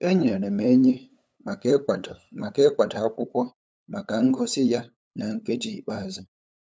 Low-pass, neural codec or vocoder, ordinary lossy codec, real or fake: none; codec, 16 kHz, 8 kbps, FunCodec, trained on LibriTTS, 25 frames a second; none; fake